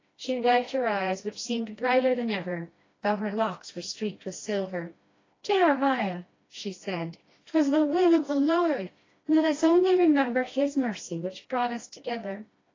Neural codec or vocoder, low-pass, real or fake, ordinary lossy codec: codec, 16 kHz, 1 kbps, FreqCodec, smaller model; 7.2 kHz; fake; AAC, 32 kbps